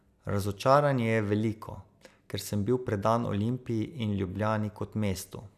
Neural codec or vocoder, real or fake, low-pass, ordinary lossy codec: none; real; 14.4 kHz; none